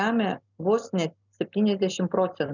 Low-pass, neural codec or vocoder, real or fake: 7.2 kHz; none; real